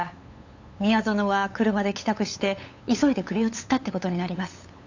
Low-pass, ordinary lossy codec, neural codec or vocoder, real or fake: 7.2 kHz; AAC, 48 kbps; codec, 16 kHz, 8 kbps, FunCodec, trained on LibriTTS, 25 frames a second; fake